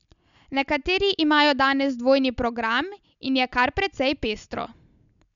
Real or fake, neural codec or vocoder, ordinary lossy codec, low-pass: real; none; none; 7.2 kHz